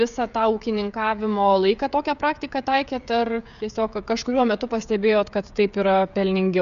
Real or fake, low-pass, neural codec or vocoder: fake; 7.2 kHz; codec, 16 kHz, 16 kbps, FreqCodec, smaller model